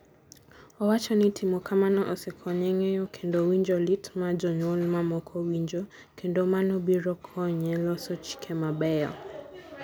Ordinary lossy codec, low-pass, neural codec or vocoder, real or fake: none; none; none; real